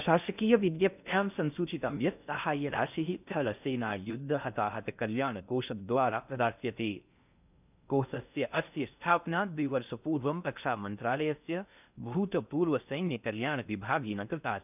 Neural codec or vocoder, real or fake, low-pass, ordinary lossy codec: codec, 16 kHz in and 24 kHz out, 0.6 kbps, FocalCodec, streaming, 4096 codes; fake; 3.6 kHz; none